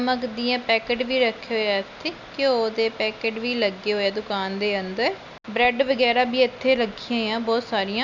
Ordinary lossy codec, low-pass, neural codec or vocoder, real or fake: none; 7.2 kHz; none; real